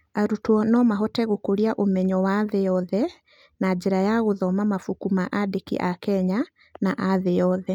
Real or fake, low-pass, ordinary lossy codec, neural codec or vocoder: real; 19.8 kHz; none; none